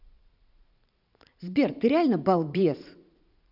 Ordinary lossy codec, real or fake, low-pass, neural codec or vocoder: none; fake; 5.4 kHz; vocoder, 44.1 kHz, 80 mel bands, Vocos